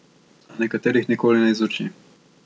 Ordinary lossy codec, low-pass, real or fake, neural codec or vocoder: none; none; real; none